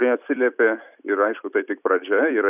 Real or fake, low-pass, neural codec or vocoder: fake; 3.6 kHz; vocoder, 44.1 kHz, 128 mel bands every 256 samples, BigVGAN v2